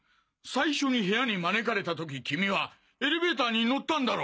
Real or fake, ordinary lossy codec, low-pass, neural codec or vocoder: real; none; none; none